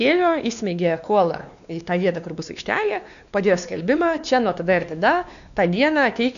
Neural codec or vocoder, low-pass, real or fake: codec, 16 kHz, 2 kbps, X-Codec, WavLM features, trained on Multilingual LibriSpeech; 7.2 kHz; fake